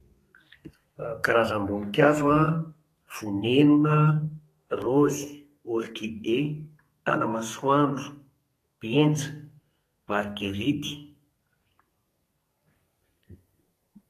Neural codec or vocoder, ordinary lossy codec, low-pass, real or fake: codec, 32 kHz, 1.9 kbps, SNAC; AAC, 48 kbps; 14.4 kHz; fake